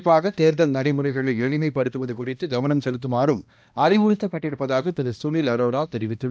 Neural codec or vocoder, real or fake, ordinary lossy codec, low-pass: codec, 16 kHz, 1 kbps, X-Codec, HuBERT features, trained on balanced general audio; fake; none; none